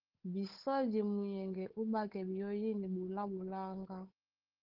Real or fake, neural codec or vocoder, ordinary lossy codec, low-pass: fake; codec, 16 kHz, 8 kbps, FunCodec, trained on Chinese and English, 25 frames a second; Opus, 16 kbps; 5.4 kHz